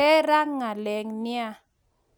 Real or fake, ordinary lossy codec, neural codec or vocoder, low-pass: real; none; none; none